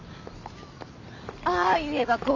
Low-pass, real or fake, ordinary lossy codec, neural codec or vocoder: 7.2 kHz; real; none; none